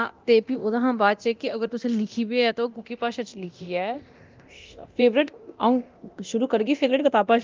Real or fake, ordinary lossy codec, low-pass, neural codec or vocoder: fake; Opus, 32 kbps; 7.2 kHz; codec, 24 kHz, 0.9 kbps, DualCodec